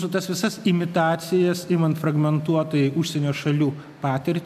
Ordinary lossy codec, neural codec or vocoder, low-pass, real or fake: MP3, 96 kbps; none; 14.4 kHz; real